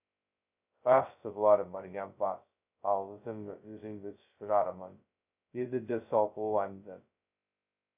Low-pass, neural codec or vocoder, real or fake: 3.6 kHz; codec, 16 kHz, 0.2 kbps, FocalCodec; fake